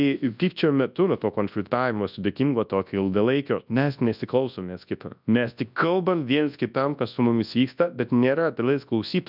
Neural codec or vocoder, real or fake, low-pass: codec, 24 kHz, 0.9 kbps, WavTokenizer, large speech release; fake; 5.4 kHz